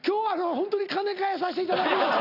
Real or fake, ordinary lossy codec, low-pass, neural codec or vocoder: real; none; 5.4 kHz; none